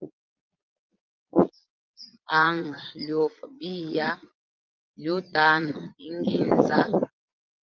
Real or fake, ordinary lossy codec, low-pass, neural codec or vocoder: fake; Opus, 24 kbps; 7.2 kHz; vocoder, 22.05 kHz, 80 mel bands, Vocos